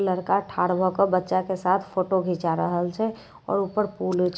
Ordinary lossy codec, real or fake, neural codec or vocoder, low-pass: none; real; none; none